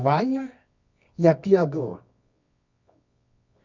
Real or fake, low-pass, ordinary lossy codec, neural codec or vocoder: fake; 7.2 kHz; none; codec, 24 kHz, 0.9 kbps, WavTokenizer, medium music audio release